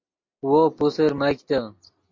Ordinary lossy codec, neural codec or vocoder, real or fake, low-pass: MP3, 48 kbps; none; real; 7.2 kHz